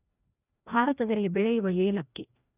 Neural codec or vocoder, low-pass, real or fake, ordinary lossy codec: codec, 16 kHz, 1 kbps, FreqCodec, larger model; 3.6 kHz; fake; none